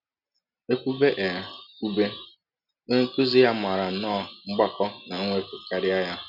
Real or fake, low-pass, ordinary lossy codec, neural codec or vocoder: real; 5.4 kHz; none; none